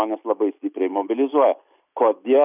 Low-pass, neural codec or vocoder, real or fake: 3.6 kHz; none; real